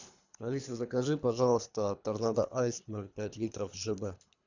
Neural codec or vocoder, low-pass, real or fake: codec, 24 kHz, 3 kbps, HILCodec; 7.2 kHz; fake